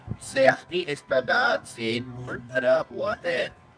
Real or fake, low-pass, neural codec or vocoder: fake; 9.9 kHz; codec, 24 kHz, 0.9 kbps, WavTokenizer, medium music audio release